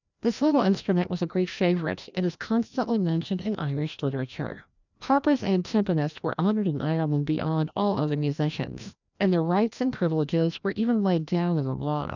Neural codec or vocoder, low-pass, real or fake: codec, 16 kHz, 1 kbps, FreqCodec, larger model; 7.2 kHz; fake